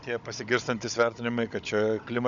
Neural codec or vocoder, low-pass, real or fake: codec, 16 kHz, 16 kbps, FunCodec, trained on Chinese and English, 50 frames a second; 7.2 kHz; fake